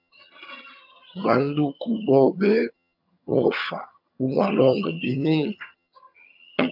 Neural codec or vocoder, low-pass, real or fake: vocoder, 22.05 kHz, 80 mel bands, HiFi-GAN; 5.4 kHz; fake